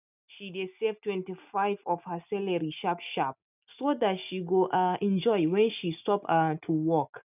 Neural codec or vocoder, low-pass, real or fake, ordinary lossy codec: none; 3.6 kHz; real; none